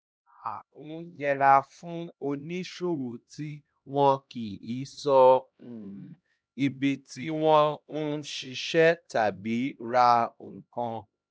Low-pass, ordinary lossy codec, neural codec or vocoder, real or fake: none; none; codec, 16 kHz, 1 kbps, X-Codec, HuBERT features, trained on LibriSpeech; fake